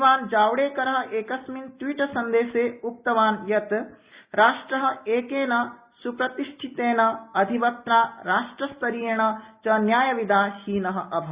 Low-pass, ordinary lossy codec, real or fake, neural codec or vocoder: 3.6 kHz; Opus, 64 kbps; real; none